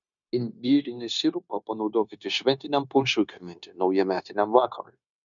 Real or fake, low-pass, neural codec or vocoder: fake; 7.2 kHz; codec, 16 kHz, 0.9 kbps, LongCat-Audio-Codec